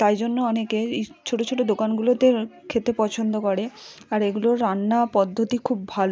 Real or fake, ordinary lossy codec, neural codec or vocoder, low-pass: real; none; none; none